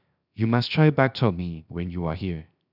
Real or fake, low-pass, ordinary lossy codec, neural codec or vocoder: fake; 5.4 kHz; none; codec, 16 kHz, 0.7 kbps, FocalCodec